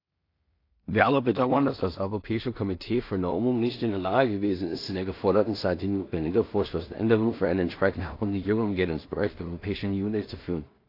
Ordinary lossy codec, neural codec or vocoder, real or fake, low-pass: AAC, 32 kbps; codec, 16 kHz in and 24 kHz out, 0.4 kbps, LongCat-Audio-Codec, two codebook decoder; fake; 5.4 kHz